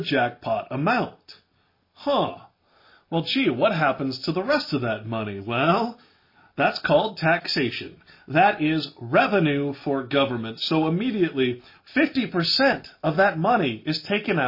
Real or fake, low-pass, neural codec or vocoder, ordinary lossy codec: real; 5.4 kHz; none; MP3, 24 kbps